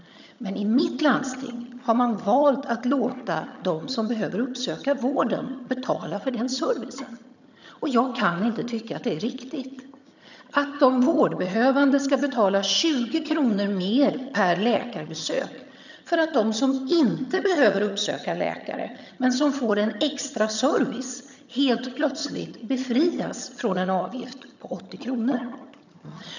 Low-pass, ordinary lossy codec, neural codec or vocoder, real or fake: 7.2 kHz; none; vocoder, 22.05 kHz, 80 mel bands, HiFi-GAN; fake